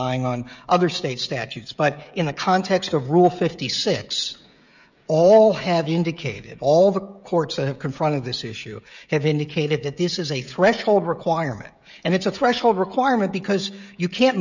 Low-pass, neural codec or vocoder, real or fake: 7.2 kHz; codec, 16 kHz, 16 kbps, FreqCodec, smaller model; fake